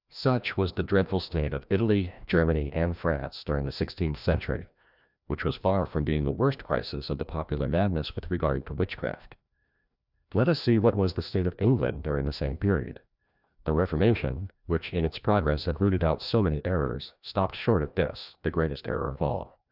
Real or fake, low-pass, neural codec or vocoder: fake; 5.4 kHz; codec, 16 kHz, 1 kbps, FreqCodec, larger model